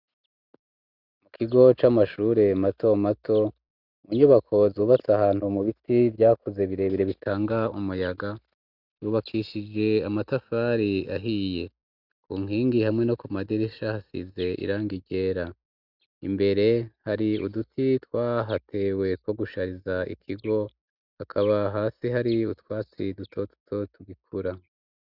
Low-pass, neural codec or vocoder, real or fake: 5.4 kHz; none; real